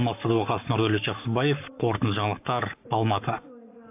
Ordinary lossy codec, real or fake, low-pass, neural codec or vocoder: AAC, 32 kbps; fake; 3.6 kHz; codec, 44.1 kHz, 7.8 kbps, Pupu-Codec